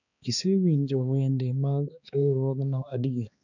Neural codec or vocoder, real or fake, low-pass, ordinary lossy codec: codec, 16 kHz, 2 kbps, X-Codec, HuBERT features, trained on balanced general audio; fake; 7.2 kHz; none